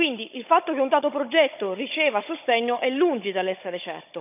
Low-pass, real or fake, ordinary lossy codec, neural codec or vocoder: 3.6 kHz; fake; none; codec, 16 kHz, 16 kbps, FunCodec, trained on Chinese and English, 50 frames a second